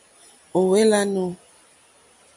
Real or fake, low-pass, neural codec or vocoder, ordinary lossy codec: real; 10.8 kHz; none; MP3, 64 kbps